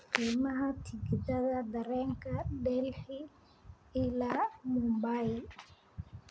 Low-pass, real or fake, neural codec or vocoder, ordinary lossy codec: none; real; none; none